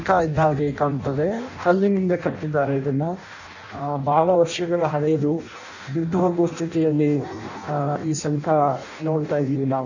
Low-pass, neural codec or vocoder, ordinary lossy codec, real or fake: 7.2 kHz; codec, 16 kHz in and 24 kHz out, 0.6 kbps, FireRedTTS-2 codec; none; fake